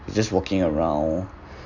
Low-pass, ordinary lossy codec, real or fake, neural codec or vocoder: 7.2 kHz; none; real; none